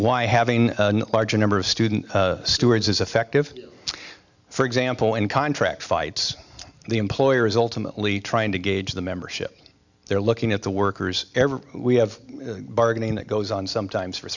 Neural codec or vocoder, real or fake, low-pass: none; real; 7.2 kHz